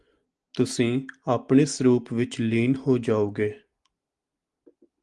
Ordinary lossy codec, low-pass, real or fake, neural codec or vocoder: Opus, 24 kbps; 10.8 kHz; real; none